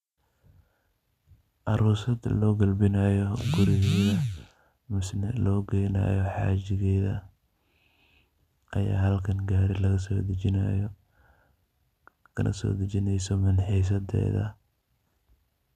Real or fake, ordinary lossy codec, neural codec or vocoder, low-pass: real; none; none; 14.4 kHz